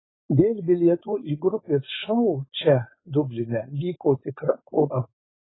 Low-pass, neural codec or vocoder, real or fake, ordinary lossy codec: 7.2 kHz; codec, 16 kHz, 4.8 kbps, FACodec; fake; AAC, 16 kbps